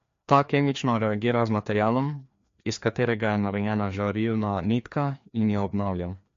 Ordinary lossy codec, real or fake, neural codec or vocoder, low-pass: MP3, 48 kbps; fake; codec, 16 kHz, 2 kbps, FreqCodec, larger model; 7.2 kHz